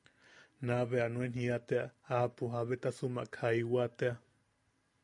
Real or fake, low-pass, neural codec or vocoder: real; 10.8 kHz; none